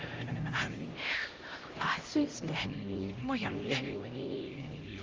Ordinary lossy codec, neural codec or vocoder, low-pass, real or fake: Opus, 32 kbps; codec, 16 kHz, 0.5 kbps, X-Codec, HuBERT features, trained on LibriSpeech; 7.2 kHz; fake